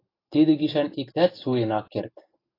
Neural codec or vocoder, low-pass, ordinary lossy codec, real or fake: none; 5.4 kHz; AAC, 24 kbps; real